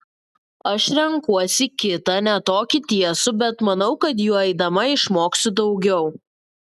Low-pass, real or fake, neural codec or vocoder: 14.4 kHz; real; none